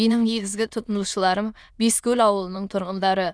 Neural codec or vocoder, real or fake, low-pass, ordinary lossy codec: autoencoder, 22.05 kHz, a latent of 192 numbers a frame, VITS, trained on many speakers; fake; none; none